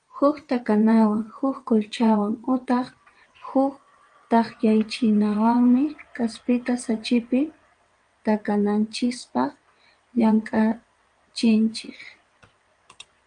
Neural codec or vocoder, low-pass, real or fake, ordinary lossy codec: vocoder, 22.05 kHz, 80 mel bands, WaveNeXt; 9.9 kHz; fake; Opus, 32 kbps